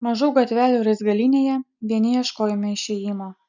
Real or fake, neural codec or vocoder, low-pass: real; none; 7.2 kHz